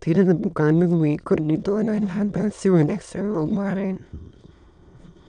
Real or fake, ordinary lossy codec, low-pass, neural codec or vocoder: fake; none; 9.9 kHz; autoencoder, 22.05 kHz, a latent of 192 numbers a frame, VITS, trained on many speakers